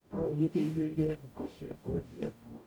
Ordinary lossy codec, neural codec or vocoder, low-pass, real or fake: none; codec, 44.1 kHz, 0.9 kbps, DAC; none; fake